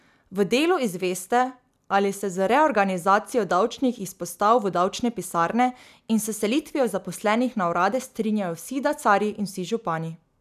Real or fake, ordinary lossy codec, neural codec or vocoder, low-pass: real; none; none; 14.4 kHz